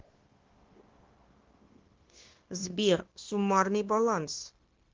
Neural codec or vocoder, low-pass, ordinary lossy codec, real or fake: codec, 16 kHz, 0.9 kbps, LongCat-Audio-Codec; 7.2 kHz; Opus, 16 kbps; fake